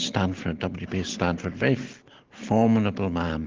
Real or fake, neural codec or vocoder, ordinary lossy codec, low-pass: real; none; Opus, 16 kbps; 7.2 kHz